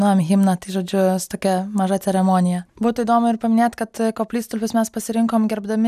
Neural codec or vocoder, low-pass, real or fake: none; 14.4 kHz; real